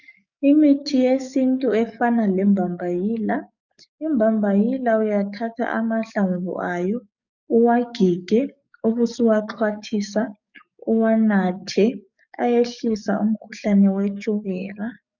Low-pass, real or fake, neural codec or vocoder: 7.2 kHz; fake; codec, 44.1 kHz, 7.8 kbps, DAC